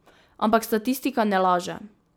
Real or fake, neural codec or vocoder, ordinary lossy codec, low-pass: real; none; none; none